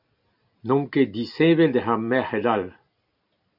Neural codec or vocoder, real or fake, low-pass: none; real; 5.4 kHz